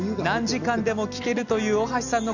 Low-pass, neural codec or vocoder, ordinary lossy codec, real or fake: 7.2 kHz; none; none; real